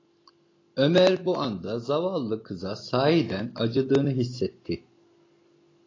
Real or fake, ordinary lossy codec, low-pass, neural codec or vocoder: real; AAC, 32 kbps; 7.2 kHz; none